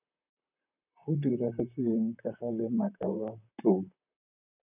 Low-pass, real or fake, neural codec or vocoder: 3.6 kHz; fake; vocoder, 44.1 kHz, 128 mel bands, Pupu-Vocoder